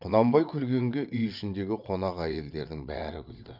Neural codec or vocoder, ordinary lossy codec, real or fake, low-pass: vocoder, 22.05 kHz, 80 mel bands, WaveNeXt; none; fake; 5.4 kHz